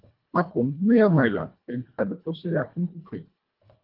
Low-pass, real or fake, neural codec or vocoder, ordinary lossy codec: 5.4 kHz; fake; codec, 24 kHz, 1.5 kbps, HILCodec; Opus, 32 kbps